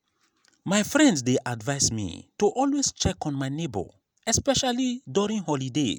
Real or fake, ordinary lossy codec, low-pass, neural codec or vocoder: real; none; none; none